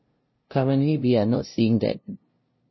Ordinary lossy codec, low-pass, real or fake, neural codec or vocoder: MP3, 24 kbps; 7.2 kHz; fake; codec, 16 kHz, 0.5 kbps, FunCodec, trained on LibriTTS, 25 frames a second